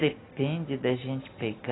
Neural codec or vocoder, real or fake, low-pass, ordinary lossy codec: none; real; 7.2 kHz; AAC, 16 kbps